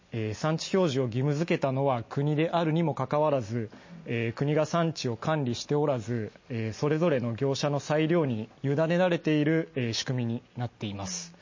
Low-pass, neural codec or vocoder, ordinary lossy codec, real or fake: 7.2 kHz; none; MP3, 32 kbps; real